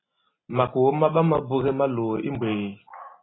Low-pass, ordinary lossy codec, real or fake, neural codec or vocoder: 7.2 kHz; AAC, 16 kbps; real; none